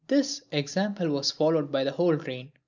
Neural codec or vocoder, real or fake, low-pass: none; real; 7.2 kHz